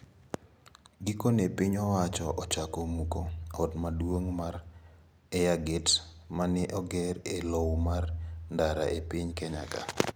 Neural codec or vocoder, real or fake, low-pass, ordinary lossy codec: none; real; none; none